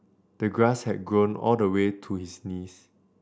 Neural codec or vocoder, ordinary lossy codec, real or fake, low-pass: none; none; real; none